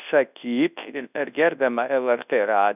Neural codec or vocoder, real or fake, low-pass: codec, 24 kHz, 0.9 kbps, WavTokenizer, large speech release; fake; 3.6 kHz